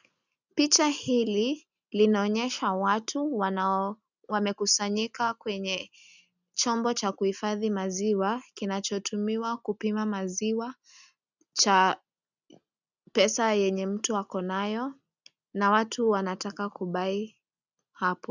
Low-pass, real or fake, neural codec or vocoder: 7.2 kHz; real; none